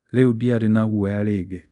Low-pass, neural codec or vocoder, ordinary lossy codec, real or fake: 10.8 kHz; codec, 24 kHz, 0.5 kbps, DualCodec; none; fake